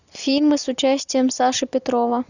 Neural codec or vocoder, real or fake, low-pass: none; real; 7.2 kHz